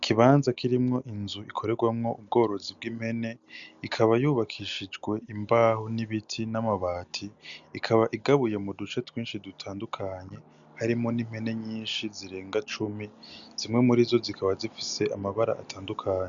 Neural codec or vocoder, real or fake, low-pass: none; real; 7.2 kHz